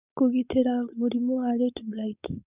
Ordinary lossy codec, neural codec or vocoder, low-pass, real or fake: Opus, 64 kbps; codec, 16 kHz, 4.8 kbps, FACodec; 3.6 kHz; fake